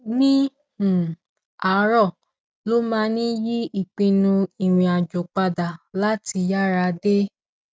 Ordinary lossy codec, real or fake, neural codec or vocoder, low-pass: none; real; none; none